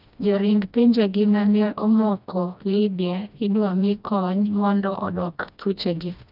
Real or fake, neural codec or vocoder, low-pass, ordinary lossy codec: fake; codec, 16 kHz, 1 kbps, FreqCodec, smaller model; 5.4 kHz; none